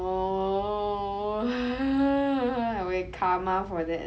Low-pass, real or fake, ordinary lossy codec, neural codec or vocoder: none; real; none; none